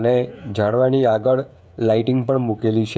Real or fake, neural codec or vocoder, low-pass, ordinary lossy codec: fake; codec, 16 kHz, 16 kbps, FreqCodec, smaller model; none; none